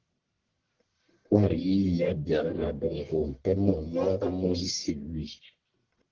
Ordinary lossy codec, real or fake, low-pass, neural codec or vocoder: Opus, 16 kbps; fake; 7.2 kHz; codec, 44.1 kHz, 1.7 kbps, Pupu-Codec